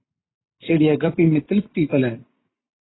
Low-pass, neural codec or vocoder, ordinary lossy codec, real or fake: 7.2 kHz; vocoder, 44.1 kHz, 128 mel bands every 256 samples, BigVGAN v2; AAC, 16 kbps; fake